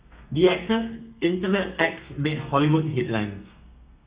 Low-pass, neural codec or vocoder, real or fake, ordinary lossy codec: 3.6 kHz; codec, 32 kHz, 1.9 kbps, SNAC; fake; Opus, 64 kbps